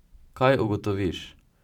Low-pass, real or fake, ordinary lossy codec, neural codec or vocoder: 19.8 kHz; fake; none; vocoder, 44.1 kHz, 128 mel bands every 512 samples, BigVGAN v2